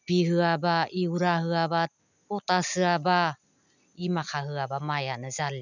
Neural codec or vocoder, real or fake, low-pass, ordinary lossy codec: none; real; 7.2 kHz; none